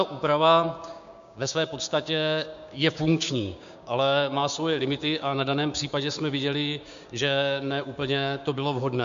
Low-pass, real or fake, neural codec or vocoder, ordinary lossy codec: 7.2 kHz; fake; codec, 16 kHz, 6 kbps, DAC; MP3, 64 kbps